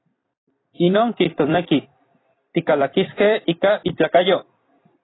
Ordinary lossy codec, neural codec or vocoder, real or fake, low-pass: AAC, 16 kbps; vocoder, 44.1 kHz, 128 mel bands every 256 samples, BigVGAN v2; fake; 7.2 kHz